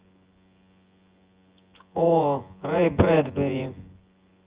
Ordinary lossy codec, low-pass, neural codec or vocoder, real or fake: Opus, 32 kbps; 3.6 kHz; vocoder, 24 kHz, 100 mel bands, Vocos; fake